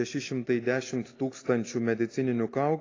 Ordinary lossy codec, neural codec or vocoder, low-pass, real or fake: AAC, 32 kbps; none; 7.2 kHz; real